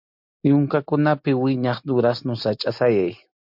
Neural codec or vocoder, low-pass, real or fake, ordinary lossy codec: none; 5.4 kHz; real; MP3, 48 kbps